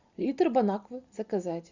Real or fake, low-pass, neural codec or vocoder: real; 7.2 kHz; none